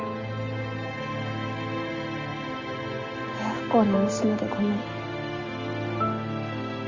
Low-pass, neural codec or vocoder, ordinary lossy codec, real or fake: 7.2 kHz; none; Opus, 32 kbps; real